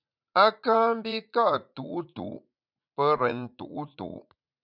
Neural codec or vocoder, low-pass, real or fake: vocoder, 44.1 kHz, 80 mel bands, Vocos; 5.4 kHz; fake